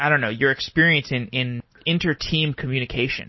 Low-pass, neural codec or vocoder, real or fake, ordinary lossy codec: 7.2 kHz; none; real; MP3, 24 kbps